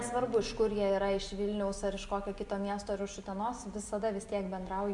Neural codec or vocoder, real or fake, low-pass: none; real; 10.8 kHz